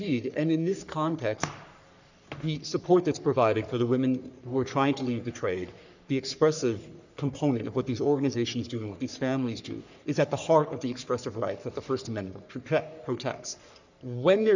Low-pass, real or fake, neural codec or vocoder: 7.2 kHz; fake; codec, 44.1 kHz, 3.4 kbps, Pupu-Codec